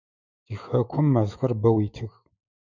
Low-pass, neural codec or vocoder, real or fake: 7.2 kHz; autoencoder, 48 kHz, 128 numbers a frame, DAC-VAE, trained on Japanese speech; fake